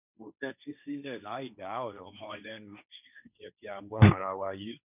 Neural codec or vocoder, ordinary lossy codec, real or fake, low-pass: codec, 16 kHz, 1.1 kbps, Voila-Tokenizer; none; fake; 3.6 kHz